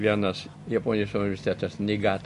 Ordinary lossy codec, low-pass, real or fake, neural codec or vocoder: MP3, 48 kbps; 10.8 kHz; real; none